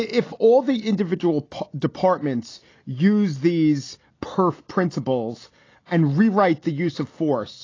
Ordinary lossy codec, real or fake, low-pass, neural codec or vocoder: AAC, 32 kbps; real; 7.2 kHz; none